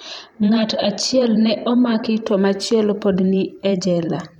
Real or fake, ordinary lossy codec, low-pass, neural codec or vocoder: fake; none; 19.8 kHz; vocoder, 44.1 kHz, 128 mel bands every 512 samples, BigVGAN v2